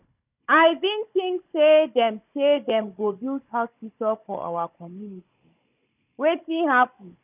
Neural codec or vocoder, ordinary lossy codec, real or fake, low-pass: codec, 16 kHz, 16 kbps, FunCodec, trained on Chinese and English, 50 frames a second; none; fake; 3.6 kHz